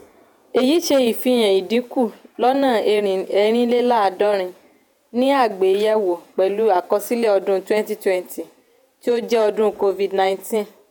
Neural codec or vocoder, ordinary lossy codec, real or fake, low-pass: vocoder, 48 kHz, 128 mel bands, Vocos; none; fake; none